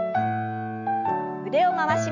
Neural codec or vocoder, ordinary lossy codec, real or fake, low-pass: none; none; real; 7.2 kHz